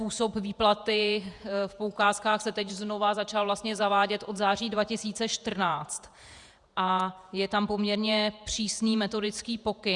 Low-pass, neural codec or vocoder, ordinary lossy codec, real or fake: 10.8 kHz; vocoder, 48 kHz, 128 mel bands, Vocos; Opus, 64 kbps; fake